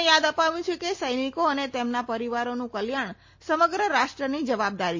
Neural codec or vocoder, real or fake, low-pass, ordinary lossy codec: none; real; 7.2 kHz; MP3, 32 kbps